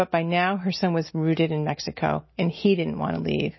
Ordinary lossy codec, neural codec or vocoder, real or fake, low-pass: MP3, 24 kbps; none; real; 7.2 kHz